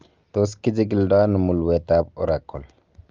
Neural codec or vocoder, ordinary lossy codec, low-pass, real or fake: none; Opus, 32 kbps; 7.2 kHz; real